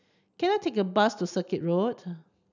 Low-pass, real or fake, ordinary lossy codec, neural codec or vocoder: 7.2 kHz; real; none; none